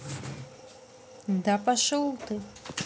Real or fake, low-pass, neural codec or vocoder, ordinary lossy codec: real; none; none; none